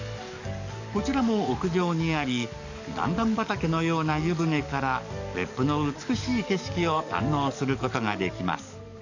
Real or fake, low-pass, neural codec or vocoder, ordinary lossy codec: fake; 7.2 kHz; codec, 44.1 kHz, 7.8 kbps, DAC; none